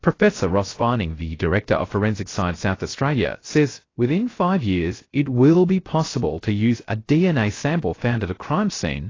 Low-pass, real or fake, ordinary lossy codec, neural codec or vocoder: 7.2 kHz; fake; AAC, 32 kbps; codec, 16 kHz, 0.3 kbps, FocalCodec